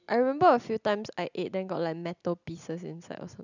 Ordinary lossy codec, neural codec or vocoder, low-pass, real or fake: none; none; 7.2 kHz; real